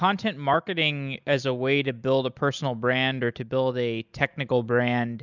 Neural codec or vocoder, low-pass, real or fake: none; 7.2 kHz; real